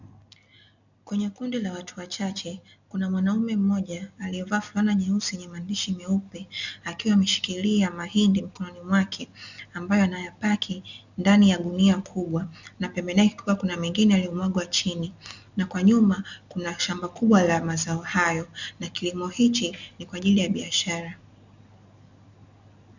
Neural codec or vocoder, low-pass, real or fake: none; 7.2 kHz; real